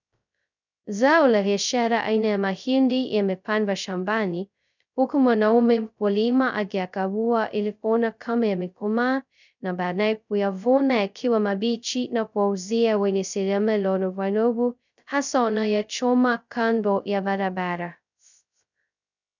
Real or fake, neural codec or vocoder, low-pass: fake; codec, 16 kHz, 0.2 kbps, FocalCodec; 7.2 kHz